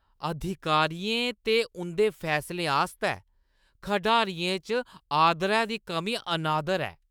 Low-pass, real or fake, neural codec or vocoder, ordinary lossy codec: none; fake; autoencoder, 48 kHz, 128 numbers a frame, DAC-VAE, trained on Japanese speech; none